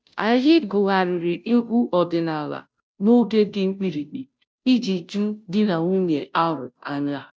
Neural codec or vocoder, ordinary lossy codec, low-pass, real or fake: codec, 16 kHz, 0.5 kbps, FunCodec, trained on Chinese and English, 25 frames a second; none; none; fake